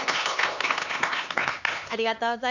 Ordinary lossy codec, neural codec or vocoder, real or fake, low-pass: none; codec, 16 kHz, 2 kbps, X-Codec, HuBERT features, trained on LibriSpeech; fake; 7.2 kHz